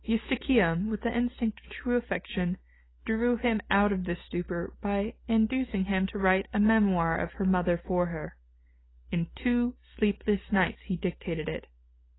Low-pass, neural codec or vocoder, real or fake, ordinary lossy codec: 7.2 kHz; none; real; AAC, 16 kbps